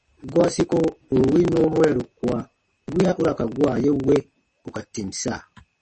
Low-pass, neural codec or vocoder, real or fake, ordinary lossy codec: 10.8 kHz; vocoder, 44.1 kHz, 128 mel bands every 512 samples, BigVGAN v2; fake; MP3, 32 kbps